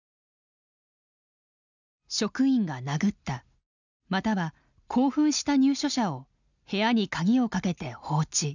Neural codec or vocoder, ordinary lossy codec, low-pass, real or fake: none; none; 7.2 kHz; real